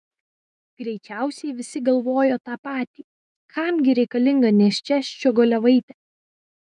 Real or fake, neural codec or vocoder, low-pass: fake; autoencoder, 48 kHz, 128 numbers a frame, DAC-VAE, trained on Japanese speech; 10.8 kHz